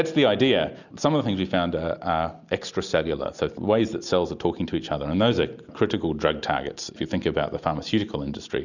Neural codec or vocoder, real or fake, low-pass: none; real; 7.2 kHz